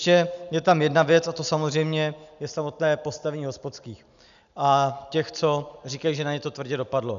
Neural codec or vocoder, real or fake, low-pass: none; real; 7.2 kHz